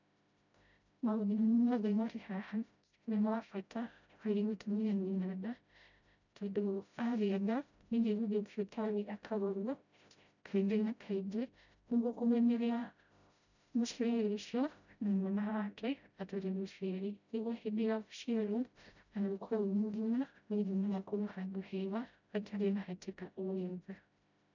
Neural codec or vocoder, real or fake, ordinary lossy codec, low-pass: codec, 16 kHz, 0.5 kbps, FreqCodec, smaller model; fake; none; 7.2 kHz